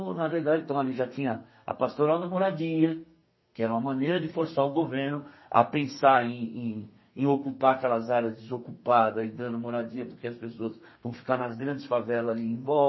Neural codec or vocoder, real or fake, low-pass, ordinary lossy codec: codec, 32 kHz, 1.9 kbps, SNAC; fake; 7.2 kHz; MP3, 24 kbps